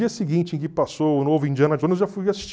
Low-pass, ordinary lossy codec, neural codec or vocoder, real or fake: none; none; none; real